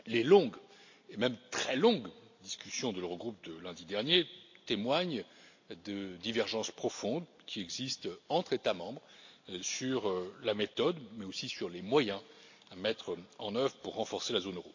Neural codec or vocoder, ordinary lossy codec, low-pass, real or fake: none; AAC, 48 kbps; 7.2 kHz; real